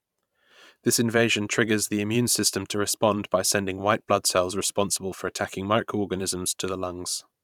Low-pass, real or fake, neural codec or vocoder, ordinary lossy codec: 19.8 kHz; fake; vocoder, 44.1 kHz, 128 mel bands every 512 samples, BigVGAN v2; none